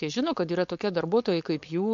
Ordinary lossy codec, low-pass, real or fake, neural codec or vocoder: MP3, 48 kbps; 7.2 kHz; fake; codec, 16 kHz, 4.8 kbps, FACodec